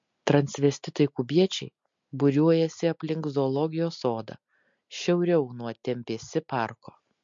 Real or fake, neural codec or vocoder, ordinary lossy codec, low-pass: real; none; MP3, 48 kbps; 7.2 kHz